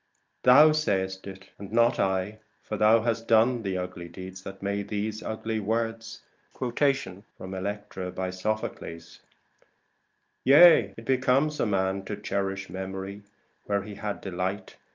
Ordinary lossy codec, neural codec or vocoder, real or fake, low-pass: Opus, 24 kbps; none; real; 7.2 kHz